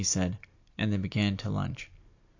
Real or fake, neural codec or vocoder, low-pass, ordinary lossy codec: real; none; 7.2 kHz; AAC, 48 kbps